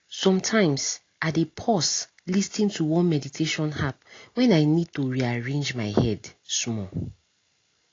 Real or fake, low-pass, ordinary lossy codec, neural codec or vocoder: real; 7.2 kHz; AAC, 32 kbps; none